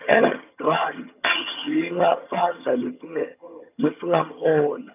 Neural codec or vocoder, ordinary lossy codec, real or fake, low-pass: vocoder, 22.05 kHz, 80 mel bands, HiFi-GAN; none; fake; 3.6 kHz